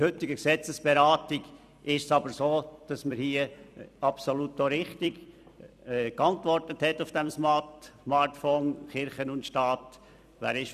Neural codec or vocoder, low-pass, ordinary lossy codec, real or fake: vocoder, 44.1 kHz, 128 mel bands every 256 samples, BigVGAN v2; 14.4 kHz; none; fake